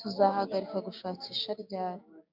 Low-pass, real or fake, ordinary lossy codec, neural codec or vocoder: 5.4 kHz; fake; MP3, 48 kbps; codec, 16 kHz, 6 kbps, DAC